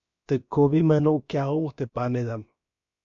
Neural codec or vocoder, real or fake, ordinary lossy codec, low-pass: codec, 16 kHz, about 1 kbps, DyCAST, with the encoder's durations; fake; MP3, 48 kbps; 7.2 kHz